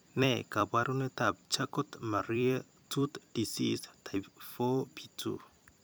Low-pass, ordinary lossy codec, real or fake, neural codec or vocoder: none; none; fake; vocoder, 44.1 kHz, 128 mel bands every 256 samples, BigVGAN v2